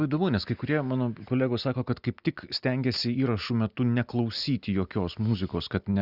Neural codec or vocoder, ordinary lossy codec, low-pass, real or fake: none; Opus, 64 kbps; 5.4 kHz; real